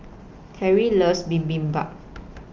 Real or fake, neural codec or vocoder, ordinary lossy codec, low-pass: real; none; Opus, 24 kbps; 7.2 kHz